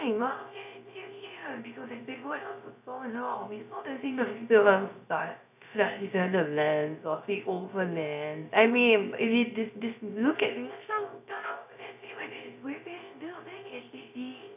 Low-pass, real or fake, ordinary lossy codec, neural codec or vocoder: 3.6 kHz; fake; none; codec, 16 kHz, 0.3 kbps, FocalCodec